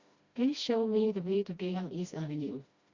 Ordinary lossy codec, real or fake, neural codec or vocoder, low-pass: Opus, 64 kbps; fake; codec, 16 kHz, 1 kbps, FreqCodec, smaller model; 7.2 kHz